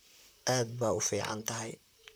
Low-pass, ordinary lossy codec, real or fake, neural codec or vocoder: none; none; fake; vocoder, 44.1 kHz, 128 mel bands, Pupu-Vocoder